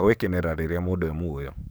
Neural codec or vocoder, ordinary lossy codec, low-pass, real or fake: codec, 44.1 kHz, 7.8 kbps, DAC; none; none; fake